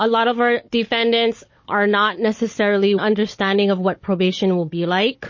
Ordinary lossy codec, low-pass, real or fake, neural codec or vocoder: MP3, 32 kbps; 7.2 kHz; fake; codec, 16 kHz, 16 kbps, FunCodec, trained on Chinese and English, 50 frames a second